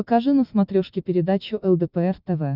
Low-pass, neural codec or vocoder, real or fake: 5.4 kHz; none; real